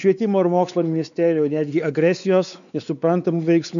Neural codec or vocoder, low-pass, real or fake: codec, 16 kHz, 4 kbps, X-Codec, WavLM features, trained on Multilingual LibriSpeech; 7.2 kHz; fake